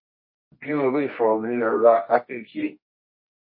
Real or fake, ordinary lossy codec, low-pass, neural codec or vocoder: fake; MP3, 24 kbps; 5.4 kHz; codec, 24 kHz, 0.9 kbps, WavTokenizer, medium music audio release